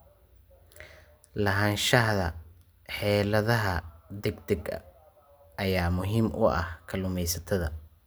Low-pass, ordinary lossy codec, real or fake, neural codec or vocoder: none; none; real; none